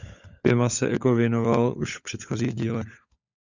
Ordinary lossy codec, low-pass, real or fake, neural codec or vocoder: Opus, 64 kbps; 7.2 kHz; fake; codec, 16 kHz, 16 kbps, FunCodec, trained on LibriTTS, 50 frames a second